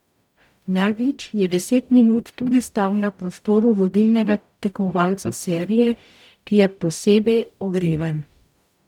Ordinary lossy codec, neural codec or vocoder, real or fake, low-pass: none; codec, 44.1 kHz, 0.9 kbps, DAC; fake; 19.8 kHz